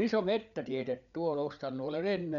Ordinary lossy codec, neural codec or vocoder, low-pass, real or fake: none; codec, 16 kHz, 8 kbps, FreqCodec, larger model; 7.2 kHz; fake